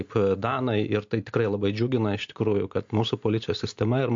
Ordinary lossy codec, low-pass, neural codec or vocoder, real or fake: MP3, 48 kbps; 7.2 kHz; none; real